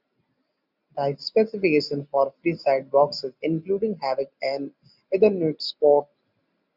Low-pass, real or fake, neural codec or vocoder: 5.4 kHz; real; none